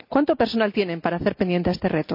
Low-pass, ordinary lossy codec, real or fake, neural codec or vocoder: 5.4 kHz; none; real; none